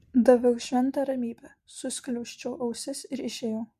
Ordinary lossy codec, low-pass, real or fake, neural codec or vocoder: MP3, 64 kbps; 14.4 kHz; real; none